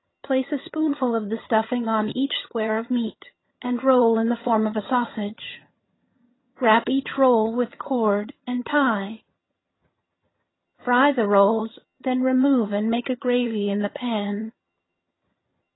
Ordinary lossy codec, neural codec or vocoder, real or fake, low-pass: AAC, 16 kbps; vocoder, 22.05 kHz, 80 mel bands, HiFi-GAN; fake; 7.2 kHz